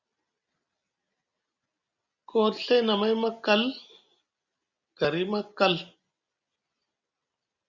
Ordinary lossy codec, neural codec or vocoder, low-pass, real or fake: Opus, 64 kbps; none; 7.2 kHz; real